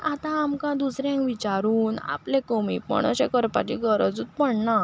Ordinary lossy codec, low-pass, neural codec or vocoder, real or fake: none; none; none; real